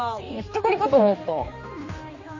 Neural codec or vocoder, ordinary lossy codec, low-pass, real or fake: codec, 16 kHz, 2 kbps, X-Codec, HuBERT features, trained on general audio; MP3, 32 kbps; 7.2 kHz; fake